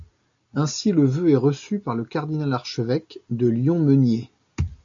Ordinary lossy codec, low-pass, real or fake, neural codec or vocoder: MP3, 64 kbps; 7.2 kHz; real; none